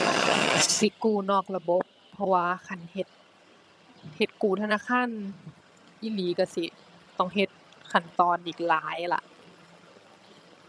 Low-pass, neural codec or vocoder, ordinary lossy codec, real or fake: none; vocoder, 22.05 kHz, 80 mel bands, HiFi-GAN; none; fake